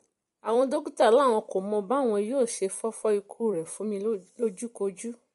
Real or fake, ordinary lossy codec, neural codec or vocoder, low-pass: fake; MP3, 48 kbps; vocoder, 44.1 kHz, 128 mel bands every 256 samples, BigVGAN v2; 14.4 kHz